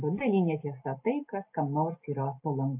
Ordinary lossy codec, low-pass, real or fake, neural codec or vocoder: AAC, 32 kbps; 3.6 kHz; real; none